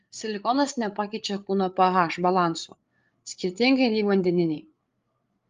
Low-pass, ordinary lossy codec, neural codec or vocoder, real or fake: 7.2 kHz; Opus, 32 kbps; codec, 16 kHz, 8 kbps, FreqCodec, larger model; fake